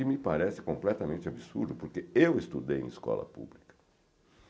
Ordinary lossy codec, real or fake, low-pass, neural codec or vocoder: none; real; none; none